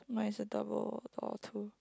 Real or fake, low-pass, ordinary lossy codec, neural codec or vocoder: real; none; none; none